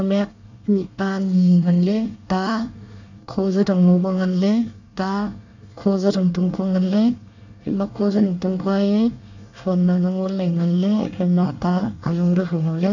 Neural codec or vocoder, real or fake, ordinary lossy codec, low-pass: codec, 24 kHz, 1 kbps, SNAC; fake; none; 7.2 kHz